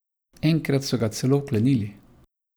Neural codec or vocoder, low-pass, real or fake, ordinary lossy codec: none; none; real; none